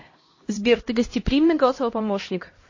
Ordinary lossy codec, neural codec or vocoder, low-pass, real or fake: MP3, 32 kbps; codec, 16 kHz, 1 kbps, X-Codec, HuBERT features, trained on LibriSpeech; 7.2 kHz; fake